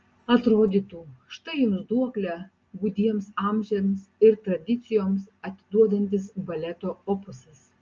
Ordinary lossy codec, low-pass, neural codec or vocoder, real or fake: Opus, 24 kbps; 7.2 kHz; none; real